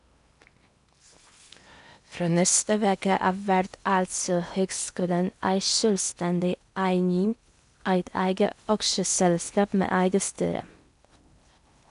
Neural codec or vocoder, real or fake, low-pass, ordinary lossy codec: codec, 16 kHz in and 24 kHz out, 0.8 kbps, FocalCodec, streaming, 65536 codes; fake; 10.8 kHz; none